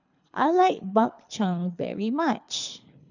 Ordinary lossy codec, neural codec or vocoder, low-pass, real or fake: none; codec, 24 kHz, 3 kbps, HILCodec; 7.2 kHz; fake